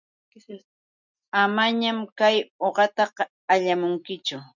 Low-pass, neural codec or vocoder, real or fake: 7.2 kHz; none; real